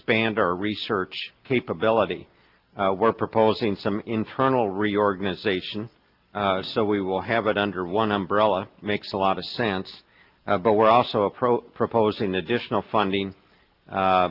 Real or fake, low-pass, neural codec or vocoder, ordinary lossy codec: real; 5.4 kHz; none; Opus, 32 kbps